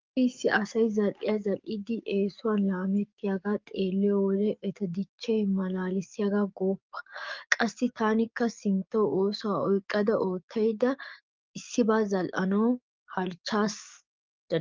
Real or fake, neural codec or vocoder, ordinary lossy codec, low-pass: fake; codec, 44.1 kHz, 7.8 kbps, DAC; Opus, 24 kbps; 7.2 kHz